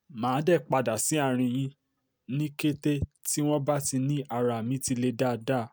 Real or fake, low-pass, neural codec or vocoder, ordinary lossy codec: real; none; none; none